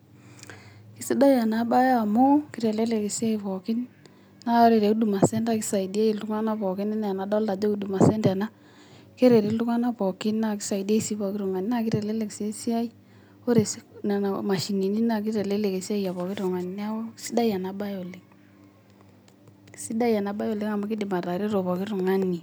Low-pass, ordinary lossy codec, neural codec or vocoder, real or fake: none; none; none; real